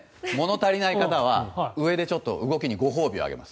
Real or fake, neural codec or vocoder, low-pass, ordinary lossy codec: real; none; none; none